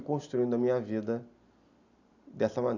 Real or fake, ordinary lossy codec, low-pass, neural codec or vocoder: real; Opus, 64 kbps; 7.2 kHz; none